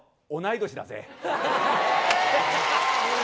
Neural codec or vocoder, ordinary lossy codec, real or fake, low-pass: none; none; real; none